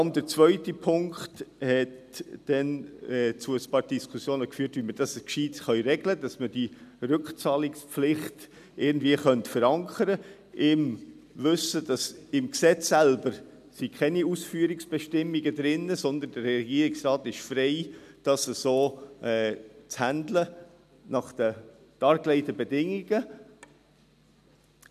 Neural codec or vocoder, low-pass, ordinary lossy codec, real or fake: none; 14.4 kHz; none; real